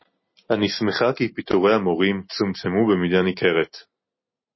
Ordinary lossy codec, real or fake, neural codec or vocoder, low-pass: MP3, 24 kbps; real; none; 7.2 kHz